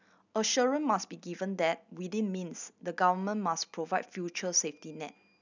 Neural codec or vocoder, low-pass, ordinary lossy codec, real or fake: none; 7.2 kHz; none; real